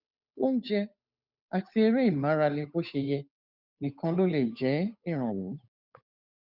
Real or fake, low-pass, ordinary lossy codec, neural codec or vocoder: fake; 5.4 kHz; none; codec, 16 kHz, 8 kbps, FunCodec, trained on Chinese and English, 25 frames a second